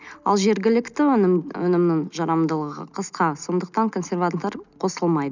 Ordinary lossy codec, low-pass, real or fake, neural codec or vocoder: none; 7.2 kHz; real; none